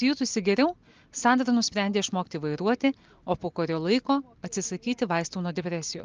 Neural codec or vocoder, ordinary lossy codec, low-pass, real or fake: none; Opus, 24 kbps; 7.2 kHz; real